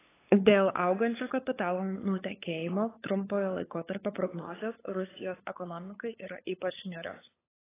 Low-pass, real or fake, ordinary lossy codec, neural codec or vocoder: 3.6 kHz; fake; AAC, 16 kbps; codec, 16 kHz, 8 kbps, FunCodec, trained on LibriTTS, 25 frames a second